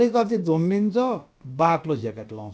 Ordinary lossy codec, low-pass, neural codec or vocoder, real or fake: none; none; codec, 16 kHz, about 1 kbps, DyCAST, with the encoder's durations; fake